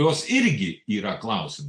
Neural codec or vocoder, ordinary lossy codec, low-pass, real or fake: none; AAC, 64 kbps; 9.9 kHz; real